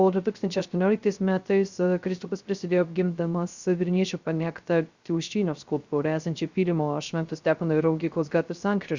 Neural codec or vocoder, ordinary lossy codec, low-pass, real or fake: codec, 16 kHz, 0.3 kbps, FocalCodec; Opus, 64 kbps; 7.2 kHz; fake